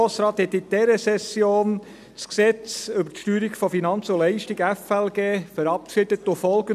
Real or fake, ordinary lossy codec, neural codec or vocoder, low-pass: real; none; none; 14.4 kHz